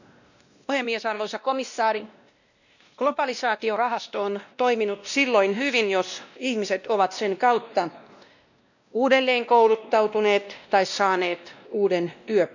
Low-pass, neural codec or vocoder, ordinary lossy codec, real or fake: 7.2 kHz; codec, 16 kHz, 1 kbps, X-Codec, WavLM features, trained on Multilingual LibriSpeech; none; fake